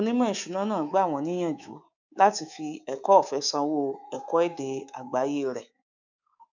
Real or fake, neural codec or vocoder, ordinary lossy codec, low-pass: fake; codec, 24 kHz, 3.1 kbps, DualCodec; none; 7.2 kHz